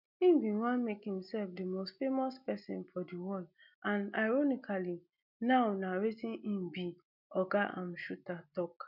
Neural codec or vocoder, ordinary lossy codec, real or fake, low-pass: none; none; real; 5.4 kHz